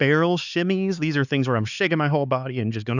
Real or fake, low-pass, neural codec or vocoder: fake; 7.2 kHz; codec, 16 kHz, 4 kbps, X-Codec, HuBERT features, trained on LibriSpeech